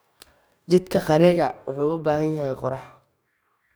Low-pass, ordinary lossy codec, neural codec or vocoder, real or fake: none; none; codec, 44.1 kHz, 2.6 kbps, DAC; fake